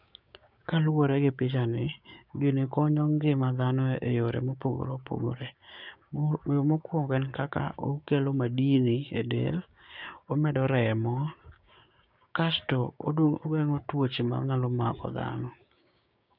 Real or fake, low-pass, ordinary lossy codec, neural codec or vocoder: fake; 5.4 kHz; none; codec, 16 kHz, 6 kbps, DAC